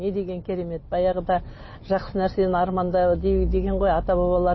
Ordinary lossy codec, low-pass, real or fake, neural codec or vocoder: MP3, 24 kbps; 7.2 kHz; real; none